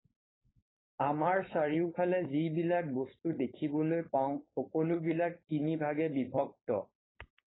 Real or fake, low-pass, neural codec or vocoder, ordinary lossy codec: fake; 7.2 kHz; codec, 16 kHz, 4.8 kbps, FACodec; AAC, 16 kbps